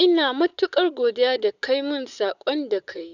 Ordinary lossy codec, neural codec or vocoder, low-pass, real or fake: none; vocoder, 44.1 kHz, 128 mel bands, Pupu-Vocoder; 7.2 kHz; fake